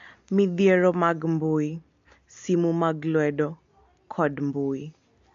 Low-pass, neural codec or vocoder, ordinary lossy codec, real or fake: 7.2 kHz; none; MP3, 48 kbps; real